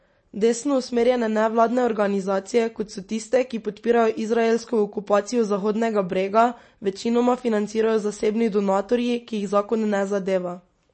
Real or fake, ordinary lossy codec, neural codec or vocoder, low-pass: real; MP3, 32 kbps; none; 9.9 kHz